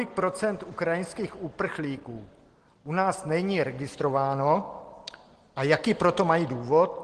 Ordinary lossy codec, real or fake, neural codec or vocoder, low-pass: Opus, 16 kbps; real; none; 9.9 kHz